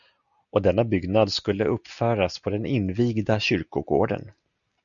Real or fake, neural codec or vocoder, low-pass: real; none; 7.2 kHz